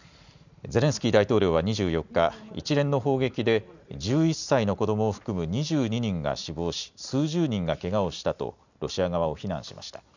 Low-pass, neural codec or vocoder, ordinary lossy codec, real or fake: 7.2 kHz; none; none; real